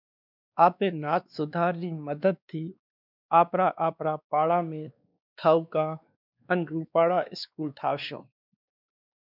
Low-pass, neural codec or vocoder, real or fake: 5.4 kHz; codec, 16 kHz, 2 kbps, X-Codec, WavLM features, trained on Multilingual LibriSpeech; fake